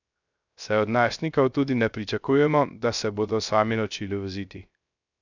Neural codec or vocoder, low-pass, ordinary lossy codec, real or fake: codec, 16 kHz, 0.3 kbps, FocalCodec; 7.2 kHz; none; fake